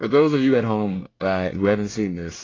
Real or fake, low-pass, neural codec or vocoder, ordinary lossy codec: fake; 7.2 kHz; codec, 24 kHz, 1 kbps, SNAC; AAC, 32 kbps